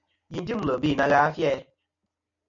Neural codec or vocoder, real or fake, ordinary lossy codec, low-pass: none; real; MP3, 96 kbps; 7.2 kHz